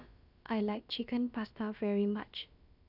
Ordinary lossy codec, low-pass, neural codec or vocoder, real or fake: none; 5.4 kHz; codec, 16 kHz, about 1 kbps, DyCAST, with the encoder's durations; fake